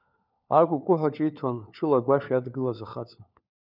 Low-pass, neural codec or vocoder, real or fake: 5.4 kHz; codec, 16 kHz, 4 kbps, FunCodec, trained on LibriTTS, 50 frames a second; fake